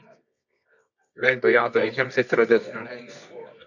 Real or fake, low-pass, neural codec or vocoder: fake; 7.2 kHz; codec, 24 kHz, 0.9 kbps, WavTokenizer, medium music audio release